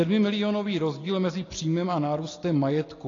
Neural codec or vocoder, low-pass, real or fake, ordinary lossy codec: none; 7.2 kHz; real; AAC, 32 kbps